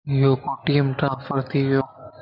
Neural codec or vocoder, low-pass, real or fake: none; 5.4 kHz; real